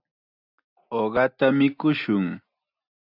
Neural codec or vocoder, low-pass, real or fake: none; 5.4 kHz; real